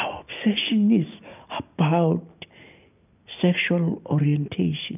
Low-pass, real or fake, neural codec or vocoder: 3.6 kHz; real; none